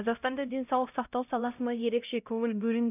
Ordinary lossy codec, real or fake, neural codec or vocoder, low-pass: none; fake; codec, 16 kHz, 0.5 kbps, X-Codec, HuBERT features, trained on LibriSpeech; 3.6 kHz